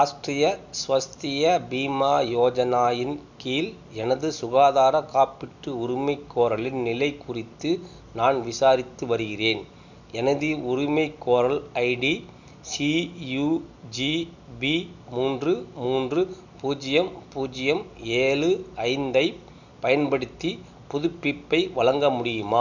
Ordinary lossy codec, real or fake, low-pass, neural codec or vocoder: none; real; 7.2 kHz; none